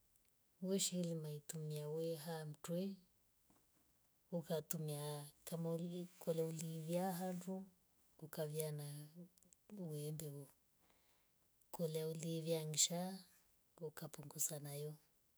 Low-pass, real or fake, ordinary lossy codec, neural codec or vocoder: none; fake; none; autoencoder, 48 kHz, 128 numbers a frame, DAC-VAE, trained on Japanese speech